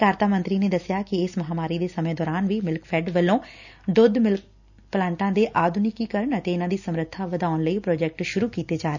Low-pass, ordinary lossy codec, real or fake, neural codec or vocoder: 7.2 kHz; none; real; none